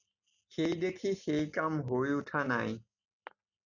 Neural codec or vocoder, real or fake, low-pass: none; real; 7.2 kHz